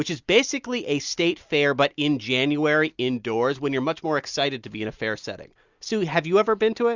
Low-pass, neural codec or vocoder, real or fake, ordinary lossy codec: 7.2 kHz; none; real; Opus, 64 kbps